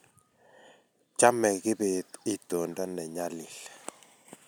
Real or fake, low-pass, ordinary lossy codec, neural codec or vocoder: real; none; none; none